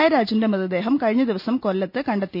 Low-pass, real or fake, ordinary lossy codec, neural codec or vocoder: 5.4 kHz; real; none; none